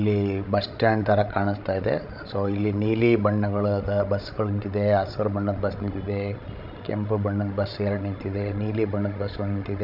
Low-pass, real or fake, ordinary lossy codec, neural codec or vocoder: 5.4 kHz; fake; MP3, 48 kbps; codec, 16 kHz, 16 kbps, FreqCodec, larger model